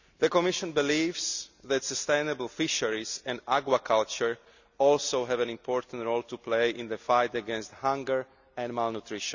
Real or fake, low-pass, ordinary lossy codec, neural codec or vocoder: real; 7.2 kHz; none; none